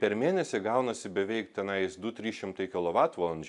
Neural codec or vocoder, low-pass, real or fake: none; 10.8 kHz; real